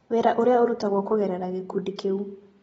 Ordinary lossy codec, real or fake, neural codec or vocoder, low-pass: AAC, 24 kbps; real; none; 9.9 kHz